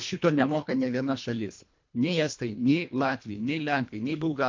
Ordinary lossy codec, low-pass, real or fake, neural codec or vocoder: MP3, 48 kbps; 7.2 kHz; fake; codec, 24 kHz, 1.5 kbps, HILCodec